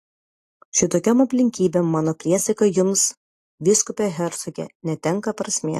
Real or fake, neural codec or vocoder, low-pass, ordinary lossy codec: real; none; 14.4 kHz; AAC, 48 kbps